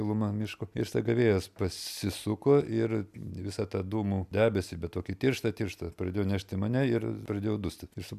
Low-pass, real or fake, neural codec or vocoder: 14.4 kHz; real; none